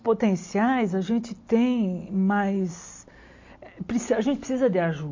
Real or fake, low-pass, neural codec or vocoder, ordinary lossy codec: real; 7.2 kHz; none; MP3, 48 kbps